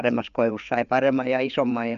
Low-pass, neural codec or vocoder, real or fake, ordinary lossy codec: 7.2 kHz; codec, 16 kHz, 4 kbps, FreqCodec, larger model; fake; MP3, 96 kbps